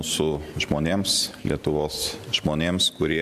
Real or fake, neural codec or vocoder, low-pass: fake; vocoder, 44.1 kHz, 128 mel bands every 512 samples, BigVGAN v2; 14.4 kHz